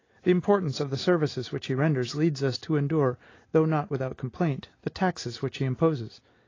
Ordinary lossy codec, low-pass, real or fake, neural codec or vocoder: AAC, 32 kbps; 7.2 kHz; real; none